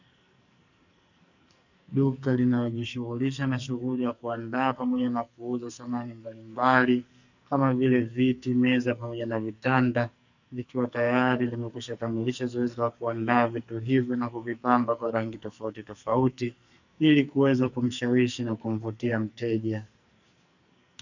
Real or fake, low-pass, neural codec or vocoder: fake; 7.2 kHz; codec, 44.1 kHz, 2.6 kbps, SNAC